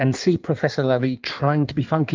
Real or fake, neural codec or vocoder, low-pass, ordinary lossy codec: fake; codec, 16 kHz in and 24 kHz out, 1.1 kbps, FireRedTTS-2 codec; 7.2 kHz; Opus, 24 kbps